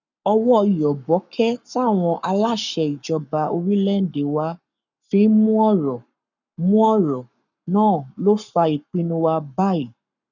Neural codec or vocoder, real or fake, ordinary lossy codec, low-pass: codec, 44.1 kHz, 7.8 kbps, Pupu-Codec; fake; none; 7.2 kHz